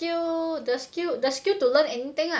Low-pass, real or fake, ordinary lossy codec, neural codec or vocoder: none; real; none; none